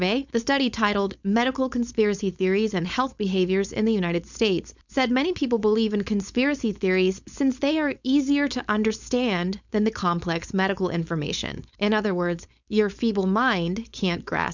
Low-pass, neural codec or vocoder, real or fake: 7.2 kHz; codec, 16 kHz, 4.8 kbps, FACodec; fake